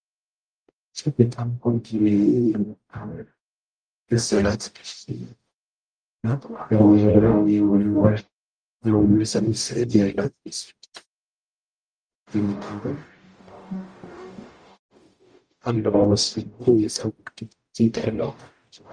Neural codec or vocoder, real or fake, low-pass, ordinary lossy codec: codec, 44.1 kHz, 0.9 kbps, DAC; fake; 9.9 kHz; Opus, 32 kbps